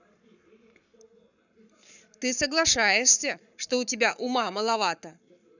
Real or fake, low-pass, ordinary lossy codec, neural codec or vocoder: fake; 7.2 kHz; none; codec, 44.1 kHz, 7.8 kbps, Pupu-Codec